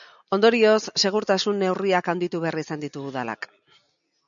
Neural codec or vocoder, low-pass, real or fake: none; 7.2 kHz; real